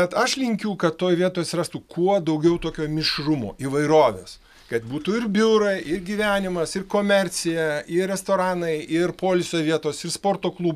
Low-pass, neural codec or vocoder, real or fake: 14.4 kHz; none; real